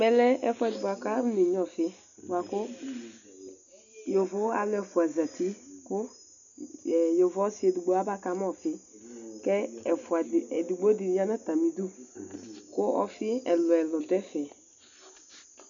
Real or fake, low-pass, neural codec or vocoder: real; 7.2 kHz; none